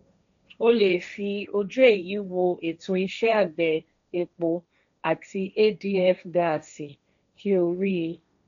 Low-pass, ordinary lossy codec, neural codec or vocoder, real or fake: 7.2 kHz; none; codec, 16 kHz, 1.1 kbps, Voila-Tokenizer; fake